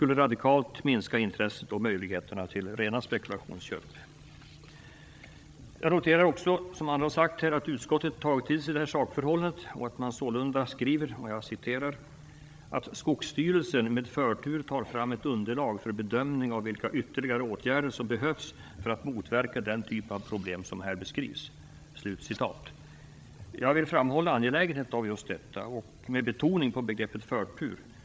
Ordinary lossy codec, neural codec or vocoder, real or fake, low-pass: none; codec, 16 kHz, 16 kbps, FreqCodec, larger model; fake; none